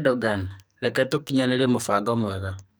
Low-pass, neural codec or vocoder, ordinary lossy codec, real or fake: none; codec, 44.1 kHz, 2.6 kbps, SNAC; none; fake